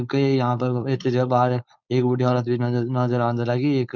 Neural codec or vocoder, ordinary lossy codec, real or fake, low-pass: codec, 16 kHz, 4.8 kbps, FACodec; none; fake; 7.2 kHz